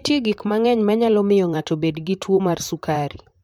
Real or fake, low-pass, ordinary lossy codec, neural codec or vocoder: fake; 19.8 kHz; MP3, 96 kbps; vocoder, 44.1 kHz, 128 mel bands, Pupu-Vocoder